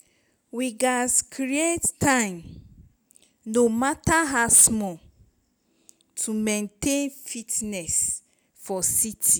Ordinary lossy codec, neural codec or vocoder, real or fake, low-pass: none; none; real; none